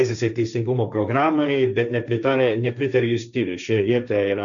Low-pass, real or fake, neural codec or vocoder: 7.2 kHz; fake; codec, 16 kHz, 1.1 kbps, Voila-Tokenizer